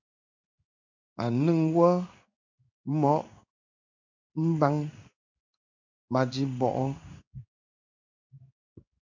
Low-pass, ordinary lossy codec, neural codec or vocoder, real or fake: 7.2 kHz; MP3, 64 kbps; codec, 16 kHz in and 24 kHz out, 1 kbps, XY-Tokenizer; fake